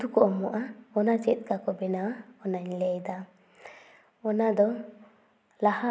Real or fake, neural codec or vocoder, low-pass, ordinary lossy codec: real; none; none; none